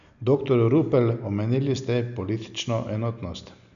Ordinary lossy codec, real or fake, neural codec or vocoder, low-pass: none; real; none; 7.2 kHz